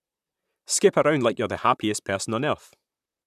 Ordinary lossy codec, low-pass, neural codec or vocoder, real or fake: none; 14.4 kHz; vocoder, 44.1 kHz, 128 mel bands, Pupu-Vocoder; fake